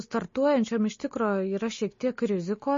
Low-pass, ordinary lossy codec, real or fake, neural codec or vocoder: 7.2 kHz; MP3, 32 kbps; real; none